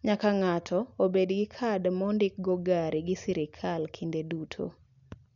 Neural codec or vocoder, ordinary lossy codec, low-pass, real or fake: none; none; 7.2 kHz; real